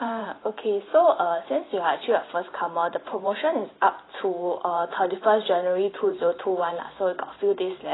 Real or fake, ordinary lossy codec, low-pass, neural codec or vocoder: fake; AAC, 16 kbps; 7.2 kHz; vocoder, 44.1 kHz, 128 mel bands every 512 samples, BigVGAN v2